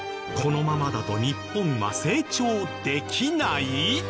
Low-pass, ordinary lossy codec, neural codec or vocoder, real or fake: none; none; none; real